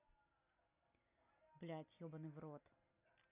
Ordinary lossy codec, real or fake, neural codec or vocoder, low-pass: none; real; none; 3.6 kHz